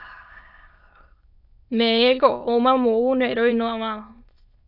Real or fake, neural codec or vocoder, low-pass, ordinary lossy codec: fake; autoencoder, 22.05 kHz, a latent of 192 numbers a frame, VITS, trained on many speakers; 5.4 kHz; AAC, 48 kbps